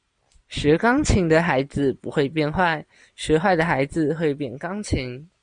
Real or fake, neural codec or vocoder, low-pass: real; none; 9.9 kHz